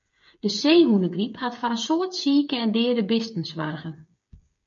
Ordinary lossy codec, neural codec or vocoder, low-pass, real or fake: MP3, 48 kbps; codec, 16 kHz, 8 kbps, FreqCodec, smaller model; 7.2 kHz; fake